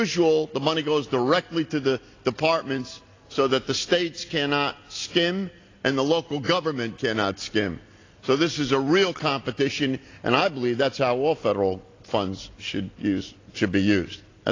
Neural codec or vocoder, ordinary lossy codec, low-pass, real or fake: none; AAC, 32 kbps; 7.2 kHz; real